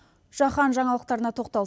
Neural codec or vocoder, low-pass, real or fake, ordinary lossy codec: none; none; real; none